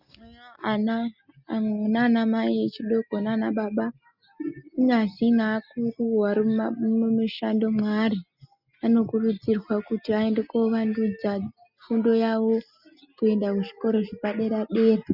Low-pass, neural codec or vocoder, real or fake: 5.4 kHz; none; real